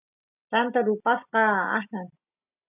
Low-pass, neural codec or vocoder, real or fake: 3.6 kHz; none; real